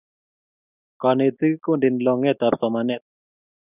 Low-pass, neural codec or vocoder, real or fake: 3.6 kHz; none; real